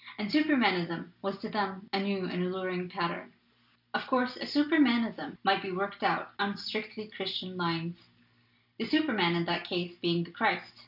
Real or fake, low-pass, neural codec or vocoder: real; 5.4 kHz; none